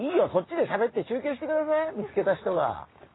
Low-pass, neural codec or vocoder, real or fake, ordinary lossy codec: 7.2 kHz; none; real; AAC, 16 kbps